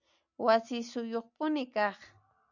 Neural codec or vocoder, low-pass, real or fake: none; 7.2 kHz; real